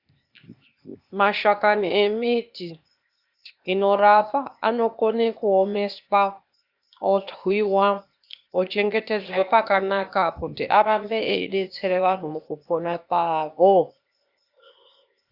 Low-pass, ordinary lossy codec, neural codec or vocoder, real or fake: 5.4 kHz; Opus, 64 kbps; codec, 16 kHz, 0.8 kbps, ZipCodec; fake